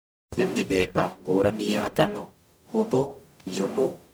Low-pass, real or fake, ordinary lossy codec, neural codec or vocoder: none; fake; none; codec, 44.1 kHz, 0.9 kbps, DAC